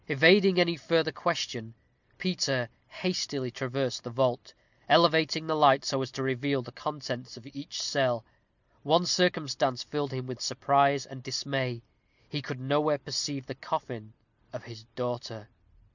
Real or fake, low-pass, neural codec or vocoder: real; 7.2 kHz; none